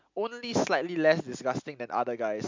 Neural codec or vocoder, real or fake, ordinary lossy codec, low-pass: none; real; MP3, 64 kbps; 7.2 kHz